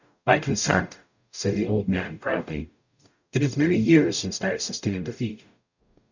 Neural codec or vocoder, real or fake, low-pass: codec, 44.1 kHz, 0.9 kbps, DAC; fake; 7.2 kHz